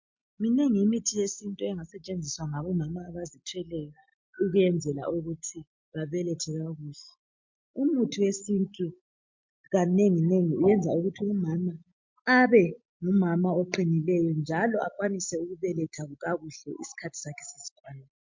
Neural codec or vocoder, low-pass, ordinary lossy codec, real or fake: none; 7.2 kHz; MP3, 48 kbps; real